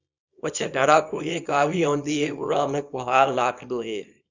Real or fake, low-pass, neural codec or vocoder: fake; 7.2 kHz; codec, 24 kHz, 0.9 kbps, WavTokenizer, small release